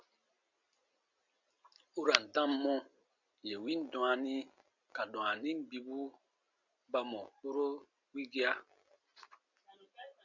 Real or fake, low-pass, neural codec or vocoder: real; 7.2 kHz; none